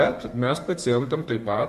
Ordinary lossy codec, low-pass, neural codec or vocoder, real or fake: MP3, 64 kbps; 14.4 kHz; codec, 32 kHz, 1.9 kbps, SNAC; fake